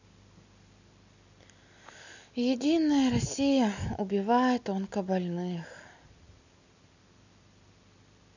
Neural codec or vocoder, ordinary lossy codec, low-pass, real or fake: none; none; 7.2 kHz; real